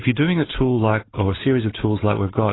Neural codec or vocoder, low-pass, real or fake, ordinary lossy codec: none; 7.2 kHz; real; AAC, 16 kbps